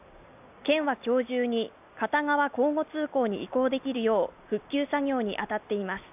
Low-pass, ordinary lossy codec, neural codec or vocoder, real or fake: 3.6 kHz; AAC, 32 kbps; none; real